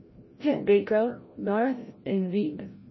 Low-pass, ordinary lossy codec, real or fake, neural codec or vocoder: 7.2 kHz; MP3, 24 kbps; fake; codec, 16 kHz, 0.5 kbps, FreqCodec, larger model